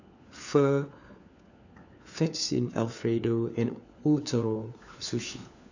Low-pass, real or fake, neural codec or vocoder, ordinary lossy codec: 7.2 kHz; fake; codec, 16 kHz, 4 kbps, FunCodec, trained on LibriTTS, 50 frames a second; none